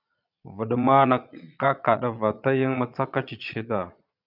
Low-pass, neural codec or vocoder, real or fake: 5.4 kHz; vocoder, 22.05 kHz, 80 mel bands, Vocos; fake